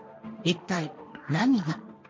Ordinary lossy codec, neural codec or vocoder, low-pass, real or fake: none; codec, 16 kHz, 1.1 kbps, Voila-Tokenizer; none; fake